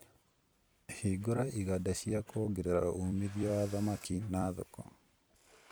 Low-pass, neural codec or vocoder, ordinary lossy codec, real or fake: none; vocoder, 44.1 kHz, 128 mel bands every 256 samples, BigVGAN v2; none; fake